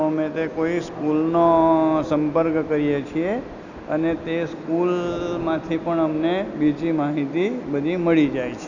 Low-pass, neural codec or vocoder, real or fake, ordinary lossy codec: 7.2 kHz; none; real; none